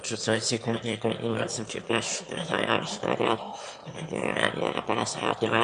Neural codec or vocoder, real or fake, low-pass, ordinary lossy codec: autoencoder, 22.05 kHz, a latent of 192 numbers a frame, VITS, trained on one speaker; fake; 9.9 kHz; MP3, 64 kbps